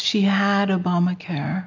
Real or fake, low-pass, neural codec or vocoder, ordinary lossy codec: real; 7.2 kHz; none; MP3, 48 kbps